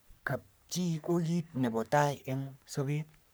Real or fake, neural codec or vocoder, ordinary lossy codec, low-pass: fake; codec, 44.1 kHz, 3.4 kbps, Pupu-Codec; none; none